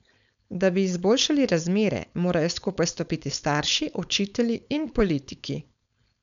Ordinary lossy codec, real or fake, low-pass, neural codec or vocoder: none; fake; 7.2 kHz; codec, 16 kHz, 4.8 kbps, FACodec